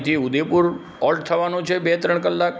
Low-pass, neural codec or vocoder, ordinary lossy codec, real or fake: none; none; none; real